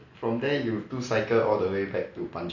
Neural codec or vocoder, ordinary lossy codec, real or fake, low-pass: none; none; real; 7.2 kHz